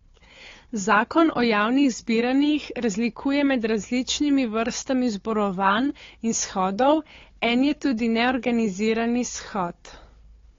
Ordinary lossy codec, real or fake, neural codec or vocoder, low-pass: AAC, 32 kbps; fake; codec, 16 kHz, 4 kbps, FunCodec, trained on Chinese and English, 50 frames a second; 7.2 kHz